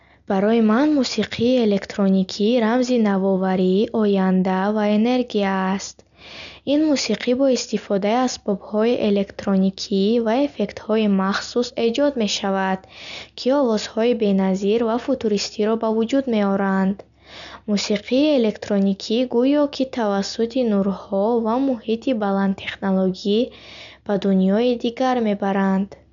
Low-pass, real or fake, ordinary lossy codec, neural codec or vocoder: 7.2 kHz; real; none; none